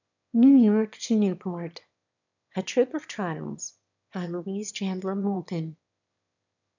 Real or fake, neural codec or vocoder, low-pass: fake; autoencoder, 22.05 kHz, a latent of 192 numbers a frame, VITS, trained on one speaker; 7.2 kHz